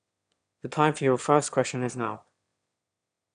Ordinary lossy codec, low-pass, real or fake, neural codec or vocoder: none; 9.9 kHz; fake; autoencoder, 22.05 kHz, a latent of 192 numbers a frame, VITS, trained on one speaker